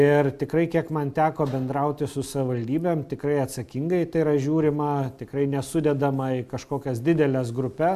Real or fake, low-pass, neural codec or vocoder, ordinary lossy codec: real; 14.4 kHz; none; MP3, 96 kbps